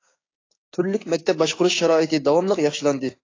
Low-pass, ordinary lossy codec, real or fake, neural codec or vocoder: 7.2 kHz; AAC, 32 kbps; fake; codec, 16 kHz, 6 kbps, DAC